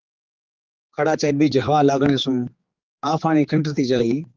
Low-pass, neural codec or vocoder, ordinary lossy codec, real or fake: 7.2 kHz; codec, 16 kHz, 4 kbps, X-Codec, HuBERT features, trained on general audio; Opus, 32 kbps; fake